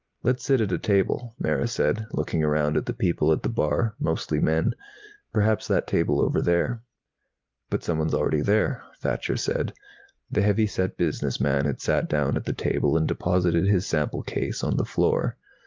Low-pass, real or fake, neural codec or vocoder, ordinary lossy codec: 7.2 kHz; real; none; Opus, 24 kbps